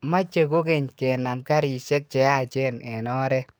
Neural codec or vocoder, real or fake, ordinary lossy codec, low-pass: codec, 44.1 kHz, 7.8 kbps, DAC; fake; none; none